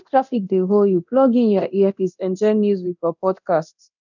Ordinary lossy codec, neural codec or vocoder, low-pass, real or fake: none; codec, 24 kHz, 0.9 kbps, DualCodec; 7.2 kHz; fake